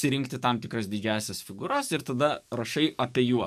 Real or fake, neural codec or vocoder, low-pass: fake; codec, 44.1 kHz, 7.8 kbps, DAC; 14.4 kHz